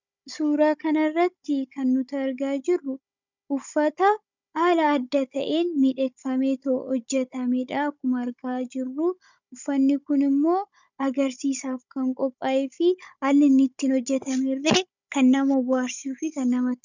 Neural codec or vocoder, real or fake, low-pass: codec, 16 kHz, 16 kbps, FunCodec, trained on Chinese and English, 50 frames a second; fake; 7.2 kHz